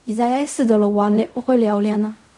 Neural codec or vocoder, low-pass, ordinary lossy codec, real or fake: codec, 16 kHz in and 24 kHz out, 0.4 kbps, LongCat-Audio-Codec, fine tuned four codebook decoder; 10.8 kHz; none; fake